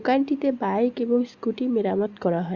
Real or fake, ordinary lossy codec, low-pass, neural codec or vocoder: real; Opus, 64 kbps; 7.2 kHz; none